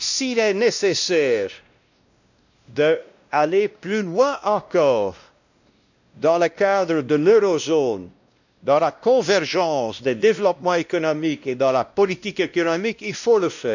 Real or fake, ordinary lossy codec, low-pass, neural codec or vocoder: fake; none; 7.2 kHz; codec, 16 kHz, 1 kbps, X-Codec, WavLM features, trained on Multilingual LibriSpeech